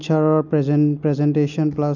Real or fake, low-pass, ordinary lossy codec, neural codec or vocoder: real; 7.2 kHz; none; none